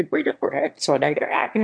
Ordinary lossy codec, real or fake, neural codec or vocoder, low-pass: MP3, 48 kbps; fake; autoencoder, 22.05 kHz, a latent of 192 numbers a frame, VITS, trained on one speaker; 9.9 kHz